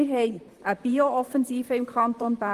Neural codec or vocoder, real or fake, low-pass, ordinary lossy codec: vocoder, 44.1 kHz, 128 mel bands, Pupu-Vocoder; fake; 14.4 kHz; Opus, 24 kbps